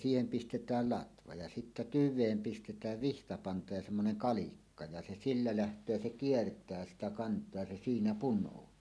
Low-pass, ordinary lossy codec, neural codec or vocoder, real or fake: none; none; none; real